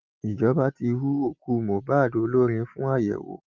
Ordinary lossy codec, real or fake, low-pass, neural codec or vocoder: Opus, 32 kbps; real; 7.2 kHz; none